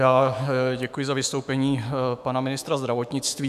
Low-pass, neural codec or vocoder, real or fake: 14.4 kHz; autoencoder, 48 kHz, 128 numbers a frame, DAC-VAE, trained on Japanese speech; fake